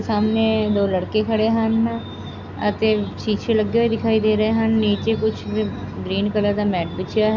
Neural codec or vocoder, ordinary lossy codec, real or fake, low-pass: none; none; real; 7.2 kHz